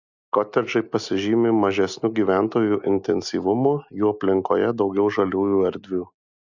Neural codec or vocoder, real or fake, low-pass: none; real; 7.2 kHz